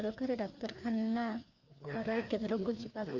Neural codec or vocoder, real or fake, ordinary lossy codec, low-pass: codec, 16 kHz, 2 kbps, FreqCodec, larger model; fake; none; 7.2 kHz